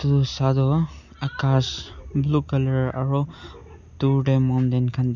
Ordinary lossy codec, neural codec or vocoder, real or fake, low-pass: none; none; real; 7.2 kHz